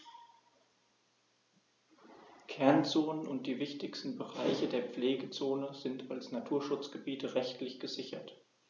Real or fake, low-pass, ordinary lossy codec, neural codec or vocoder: real; 7.2 kHz; none; none